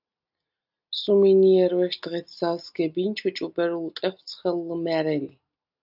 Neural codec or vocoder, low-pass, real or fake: none; 5.4 kHz; real